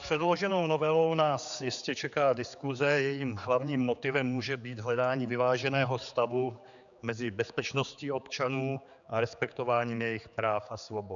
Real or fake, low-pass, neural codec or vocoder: fake; 7.2 kHz; codec, 16 kHz, 4 kbps, X-Codec, HuBERT features, trained on general audio